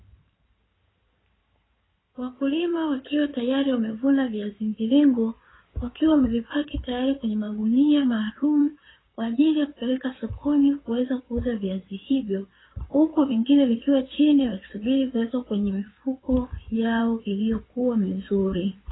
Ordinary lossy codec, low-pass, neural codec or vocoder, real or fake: AAC, 16 kbps; 7.2 kHz; codec, 16 kHz in and 24 kHz out, 2.2 kbps, FireRedTTS-2 codec; fake